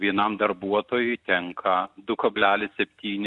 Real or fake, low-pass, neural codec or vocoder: real; 10.8 kHz; none